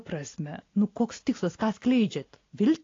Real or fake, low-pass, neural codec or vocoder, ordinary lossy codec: real; 7.2 kHz; none; AAC, 32 kbps